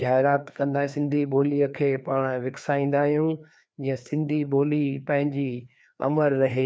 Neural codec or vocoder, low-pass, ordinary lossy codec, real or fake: codec, 16 kHz, 2 kbps, FreqCodec, larger model; none; none; fake